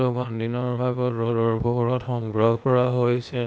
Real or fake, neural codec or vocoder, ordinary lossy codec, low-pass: fake; codec, 16 kHz, 0.8 kbps, ZipCodec; none; none